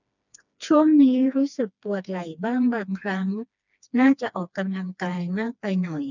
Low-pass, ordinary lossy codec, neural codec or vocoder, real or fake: 7.2 kHz; none; codec, 16 kHz, 2 kbps, FreqCodec, smaller model; fake